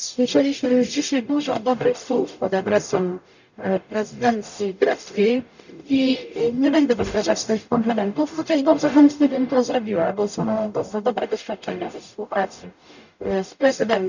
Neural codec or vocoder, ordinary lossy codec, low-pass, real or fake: codec, 44.1 kHz, 0.9 kbps, DAC; none; 7.2 kHz; fake